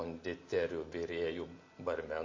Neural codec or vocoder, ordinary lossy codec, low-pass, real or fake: none; MP3, 32 kbps; 7.2 kHz; real